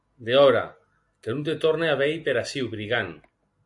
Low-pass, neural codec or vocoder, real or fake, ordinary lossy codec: 10.8 kHz; none; real; AAC, 64 kbps